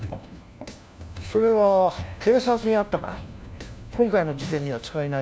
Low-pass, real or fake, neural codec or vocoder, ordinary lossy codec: none; fake; codec, 16 kHz, 1 kbps, FunCodec, trained on LibriTTS, 50 frames a second; none